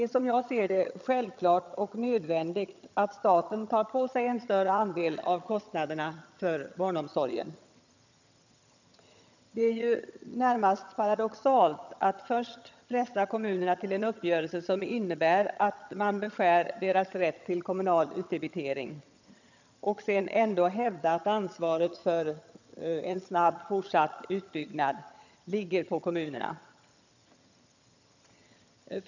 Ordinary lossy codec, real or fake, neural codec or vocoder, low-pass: none; fake; vocoder, 22.05 kHz, 80 mel bands, HiFi-GAN; 7.2 kHz